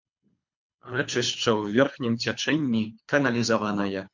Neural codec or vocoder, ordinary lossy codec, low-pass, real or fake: codec, 24 kHz, 3 kbps, HILCodec; MP3, 48 kbps; 7.2 kHz; fake